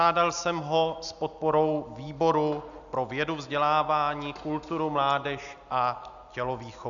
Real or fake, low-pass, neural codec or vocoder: real; 7.2 kHz; none